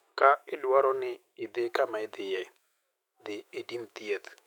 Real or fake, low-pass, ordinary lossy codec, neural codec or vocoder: fake; 19.8 kHz; none; autoencoder, 48 kHz, 128 numbers a frame, DAC-VAE, trained on Japanese speech